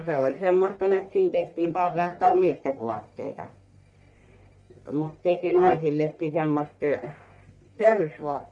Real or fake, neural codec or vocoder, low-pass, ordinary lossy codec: fake; codec, 44.1 kHz, 1.7 kbps, Pupu-Codec; 10.8 kHz; none